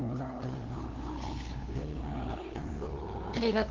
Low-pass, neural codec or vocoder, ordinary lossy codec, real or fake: 7.2 kHz; codec, 16 kHz, 2 kbps, FunCodec, trained on LibriTTS, 25 frames a second; Opus, 16 kbps; fake